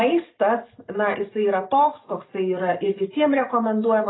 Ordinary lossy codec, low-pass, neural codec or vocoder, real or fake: AAC, 16 kbps; 7.2 kHz; none; real